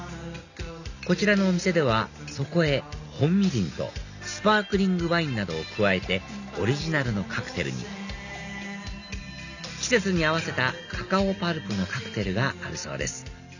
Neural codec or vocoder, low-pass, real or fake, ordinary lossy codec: none; 7.2 kHz; real; none